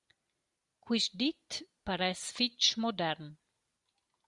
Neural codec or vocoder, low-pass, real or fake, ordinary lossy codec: none; 10.8 kHz; real; Opus, 64 kbps